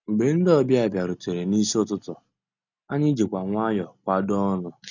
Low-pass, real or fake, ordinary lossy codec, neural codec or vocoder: 7.2 kHz; real; none; none